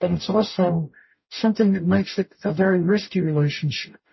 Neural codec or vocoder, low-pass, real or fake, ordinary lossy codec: codec, 44.1 kHz, 0.9 kbps, DAC; 7.2 kHz; fake; MP3, 24 kbps